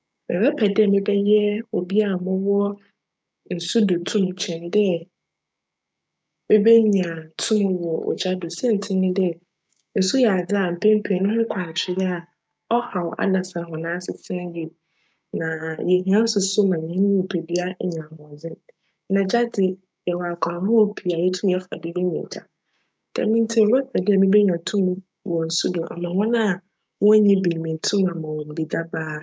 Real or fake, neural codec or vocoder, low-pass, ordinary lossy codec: fake; codec, 16 kHz, 6 kbps, DAC; none; none